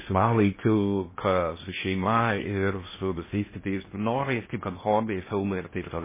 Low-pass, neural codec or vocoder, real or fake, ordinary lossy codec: 3.6 kHz; codec, 16 kHz in and 24 kHz out, 0.6 kbps, FocalCodec, streaming, 2048 codes; fake; MP3, 16 kbps